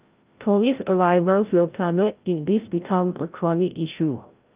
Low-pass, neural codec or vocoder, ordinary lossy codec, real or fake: 3.6 kHz; codec, 16 kHz, 0.5 kbps, FreqCodec, larger model; Opus, 32 kbps; fake